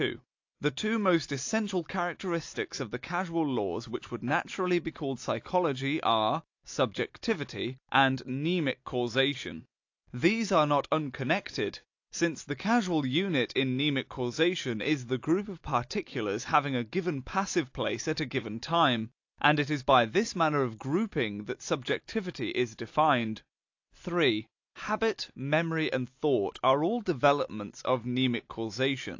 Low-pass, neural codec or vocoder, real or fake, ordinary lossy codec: 7.2 kHz; none; real; AAC, 48 kbps